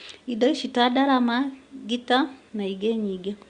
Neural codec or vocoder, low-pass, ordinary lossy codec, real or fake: none; 9.9 kHz; Opus, 64 kbps; real